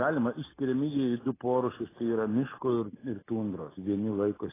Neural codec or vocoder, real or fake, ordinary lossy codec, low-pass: none; real; AAC, 16 kbps; 3.6 kHz